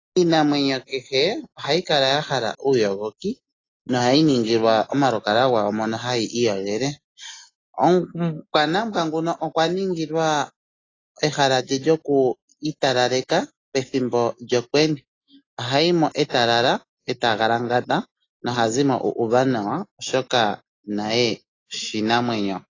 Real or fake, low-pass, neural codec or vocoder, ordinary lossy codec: real; 7.2 kHz; none; AAC, 32 kbps